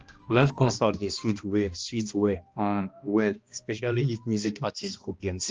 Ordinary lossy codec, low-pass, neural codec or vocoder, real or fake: Opus, 24 kbps; 7.2 kHz; codec, 16 kHz, 1 kbps, X-Codec, HuBERT features, trained on balanced general audio; fake